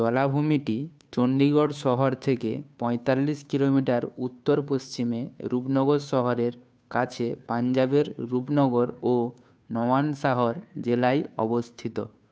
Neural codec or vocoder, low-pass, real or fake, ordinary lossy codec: codec, 16 kHz, 2 kbps, FunCodec, trained on Chinese and English, 25 frames a second; none; fake; none